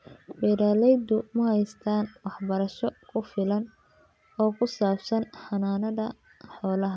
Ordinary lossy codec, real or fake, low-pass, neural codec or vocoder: none; real; none; none